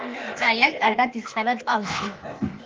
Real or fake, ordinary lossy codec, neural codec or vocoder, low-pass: fake; Opus, 32 kbps; codec, 16 kHz, 0.8 kbps, ZipCodec; 7.2 kHz